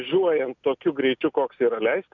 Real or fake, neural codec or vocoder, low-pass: real; none; 7.2 kHz